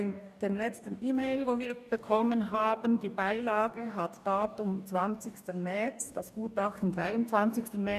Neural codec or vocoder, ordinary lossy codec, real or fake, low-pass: codec, 44.1 kHz, 2.6 kbps, DAC; none; fake; 14.4 kHz